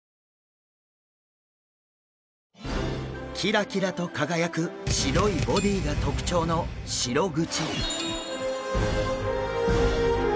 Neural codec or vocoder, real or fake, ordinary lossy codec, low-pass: none; real; none; none